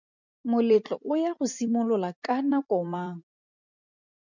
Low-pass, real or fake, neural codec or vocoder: 7.2 kHz; fake; vocoder, 44.1 kHz, 128 mel bands every 256 samples, BigVGAN v2